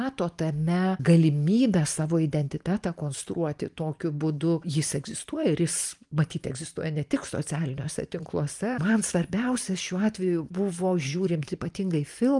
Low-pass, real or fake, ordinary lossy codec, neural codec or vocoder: 10.8 kHz; fake; Opus, 32 kbps; vocoder, 44.1 kHz, 128 mel bands every 512 samples, BigVGAN v2